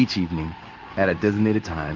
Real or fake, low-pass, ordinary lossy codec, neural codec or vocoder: fake; 7.2 kHz; Opus, 32 kbps; codec, 16 kHz in and 24 kHz out, 1 kbps, XY-Tokenizer